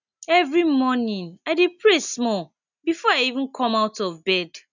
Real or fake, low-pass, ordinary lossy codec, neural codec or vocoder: real; 7.2 kHz; none; none